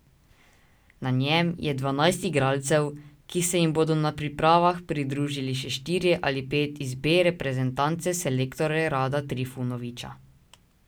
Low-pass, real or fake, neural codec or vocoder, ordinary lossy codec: none; real; none; none